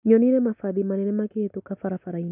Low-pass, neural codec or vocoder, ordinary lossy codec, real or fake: 3.6 kHz; none; none; real